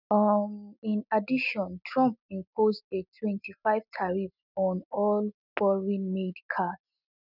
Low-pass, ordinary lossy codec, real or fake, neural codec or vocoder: 5.4 kHz; none; real; none